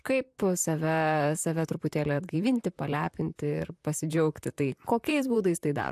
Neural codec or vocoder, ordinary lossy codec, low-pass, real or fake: vocoder, 44.1 kHz, 128 mel bands, Pupu-Vocoder; AAC, 96 kbps; 14.4 kHz; fake